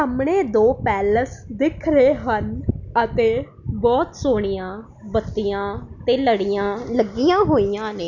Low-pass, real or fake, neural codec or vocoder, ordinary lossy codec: 7.2 kHz; real; none; none